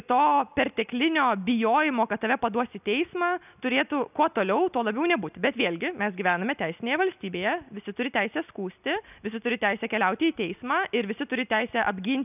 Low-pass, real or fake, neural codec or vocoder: 3.6 kHz; real; none